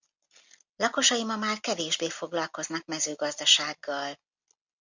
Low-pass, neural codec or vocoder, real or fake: 7.2 kHz; none; real